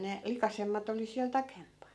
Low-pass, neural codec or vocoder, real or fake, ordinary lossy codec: none; none; real; none